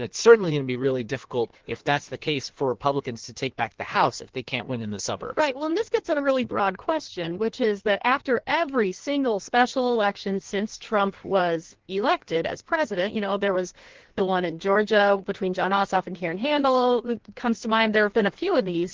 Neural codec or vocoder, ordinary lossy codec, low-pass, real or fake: codec, 16 kHz in and 24 kHz out, 1.1 kbps, FireRedTTS-2 codec; Opus, 16 kbps; 7.2 kHz; fake